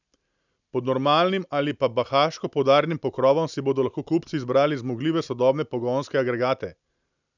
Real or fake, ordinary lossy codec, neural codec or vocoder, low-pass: real; none; none; 7.2 kHz